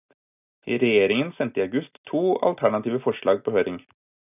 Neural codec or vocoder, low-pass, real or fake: none; 3.6 kHz; real